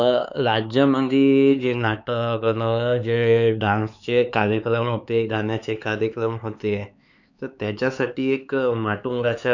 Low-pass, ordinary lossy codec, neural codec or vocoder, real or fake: 7.2 kHz; none; codec, 16 kHz, 4 kbps, X-Codec, HuBERT features, trained on LibriSpeech; fake